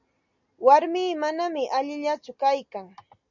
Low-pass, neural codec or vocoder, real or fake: 7.2 kHz; none; real